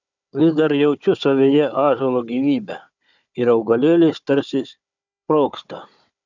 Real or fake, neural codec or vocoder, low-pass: fake; codec, 16 kHz, 4 kbps, FunCodec, trained on Chinese and English, 50 frames a second; 7.2 kHz